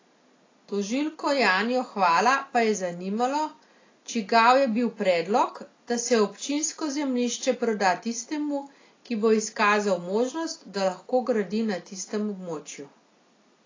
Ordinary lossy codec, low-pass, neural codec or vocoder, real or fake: AAC, 32 kbps; 7.2 kHz; none; real